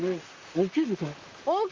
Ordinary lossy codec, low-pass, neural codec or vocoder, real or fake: Opus, 32 kbps; 7.2 kHz; vocoder, 44.1 kHz, 128 mel bands, Pupu-Vocoder; fake